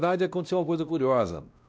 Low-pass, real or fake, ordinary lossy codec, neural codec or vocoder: none; fake; none; codec, 16 kHz, 1 kbps, X-Codec, WavLM features, trained on Multilingual LibriSpeech